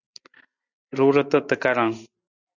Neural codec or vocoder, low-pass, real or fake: none; 7.2 kHz; real